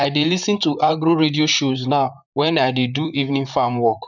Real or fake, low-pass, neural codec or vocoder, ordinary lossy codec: fake; 7.2 kHz; vocoder, 44.1 kHz, 128 mel bands, Pupu-Vocoder; none